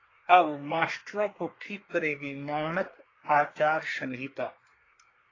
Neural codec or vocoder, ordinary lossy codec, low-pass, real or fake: codec, 24 kHz, 1 kbps, SNAC; AAC, 32 kbps; 7.2 kHz; fake